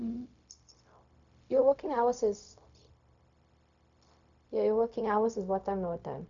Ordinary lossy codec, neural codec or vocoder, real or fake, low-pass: none; codec, 16 kHz, 0.4 kbps, LongCat-Audio-Codec; fake; 7.2 kHz